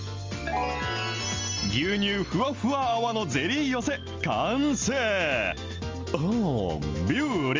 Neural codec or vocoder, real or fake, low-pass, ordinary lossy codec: none; real; 7.2 kHz; Opus, 32 kbps